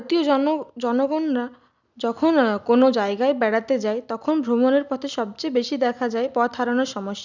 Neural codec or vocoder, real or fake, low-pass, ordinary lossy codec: none; real; 7.2 kHz; none